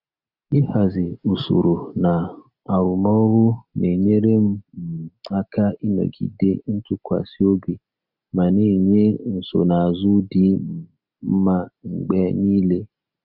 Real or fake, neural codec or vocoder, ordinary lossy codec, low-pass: real; none; none; 5.4 kHz